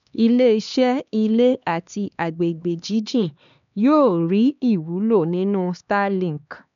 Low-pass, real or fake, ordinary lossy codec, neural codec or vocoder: 7.2 kHz; fake; none; codec, 16 kHz, 2 kbps, X-Codec, HuBERT features, trained on LibriSpeech